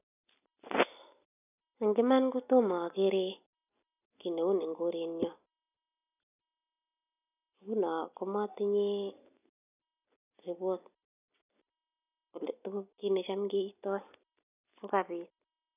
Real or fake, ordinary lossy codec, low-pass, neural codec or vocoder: real; none; 3.6 kHz; none